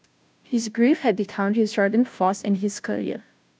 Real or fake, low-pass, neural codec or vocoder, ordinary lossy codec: fake; none; codec, 16 kHz, 0.5 kbps, FunCodec, trained on Chinese and English, 25 frames a second; none